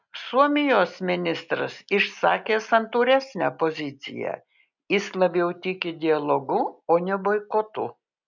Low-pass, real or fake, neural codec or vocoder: 7.2 kHz; real; none